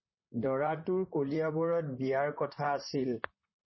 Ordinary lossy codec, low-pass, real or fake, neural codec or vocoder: MP3, 24 kbps; 7.2 kHz; fake; vocoder, 44.1 kHz, 128 mel bands, Pupu-Vocoder